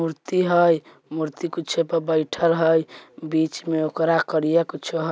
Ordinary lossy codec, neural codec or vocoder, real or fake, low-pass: none; none; real; none